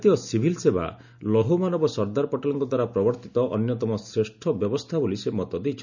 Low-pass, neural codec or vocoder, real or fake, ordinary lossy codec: 7.2 kHz; none; real; none